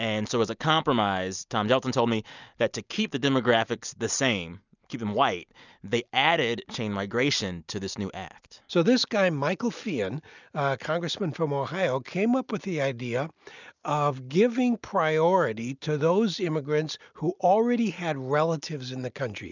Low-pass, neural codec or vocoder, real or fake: 7.2 kHz; none; real